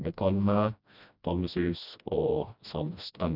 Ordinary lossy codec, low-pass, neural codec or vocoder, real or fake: none; 5.4 kHz; codec, 16 kHz, 1 kbps, FreqCodec, smaller model; fake